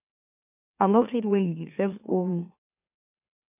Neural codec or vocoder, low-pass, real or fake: autoencoder, 44.1 kHz, a latent of 192 numbers a frame, MeloTTS; 3.6 kHz; fake